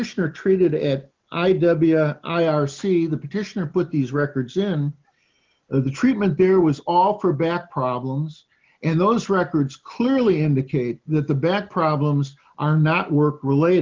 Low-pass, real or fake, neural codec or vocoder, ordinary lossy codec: 7.2 kHz; real; none; Opus, 32 kbps